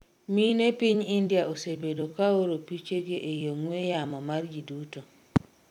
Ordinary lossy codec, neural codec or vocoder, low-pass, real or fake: none; vocoder, 44.1 kHz, 128 mel bands every 256 samples, BigVGAN v2; 19.8 kHz; fake